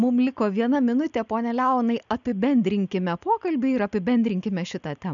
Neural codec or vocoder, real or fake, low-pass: none; real; 7.2 kHz